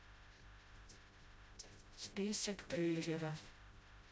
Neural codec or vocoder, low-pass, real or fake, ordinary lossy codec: codec, 16 kHz, 0.5 kbps, FreqCodec, smaller model; none; fake; none